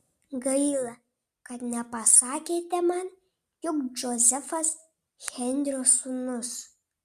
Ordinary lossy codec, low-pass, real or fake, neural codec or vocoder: Opus, 64 kbps; 14.4 kHz; real; none